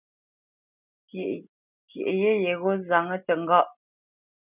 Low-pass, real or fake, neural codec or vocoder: 3.6 kHz; real; none